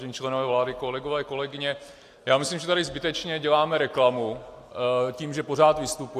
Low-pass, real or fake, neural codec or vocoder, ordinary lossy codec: 14.4 kHz; real; none; AAC, 64 kbps